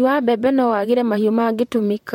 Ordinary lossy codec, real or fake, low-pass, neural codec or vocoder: MP3, 64 kbps; fake; 19.8 kHz; vocoder, 44.1 kHz, 128 mel bands, Pupu-Vocoder